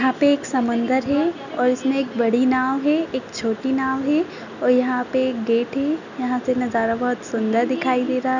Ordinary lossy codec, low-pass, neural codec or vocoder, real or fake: none; 7.2 kHz; none; real